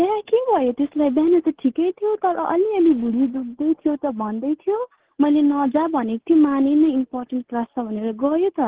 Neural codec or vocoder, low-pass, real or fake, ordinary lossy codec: none; 3.6 kHz; real; Opus, 16 kbps